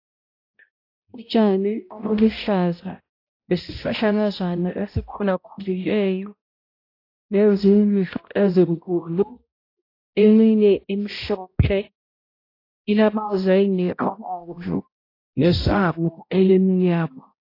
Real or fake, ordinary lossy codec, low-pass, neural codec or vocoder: fake; AAC, 32 kbps; 5.4 kHz; codec, 16 kHz, 0.5 kbps, X-Codec, HuBERT features, trained on balanced general audio